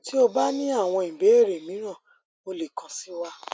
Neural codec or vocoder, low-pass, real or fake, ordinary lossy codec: none; none; real; none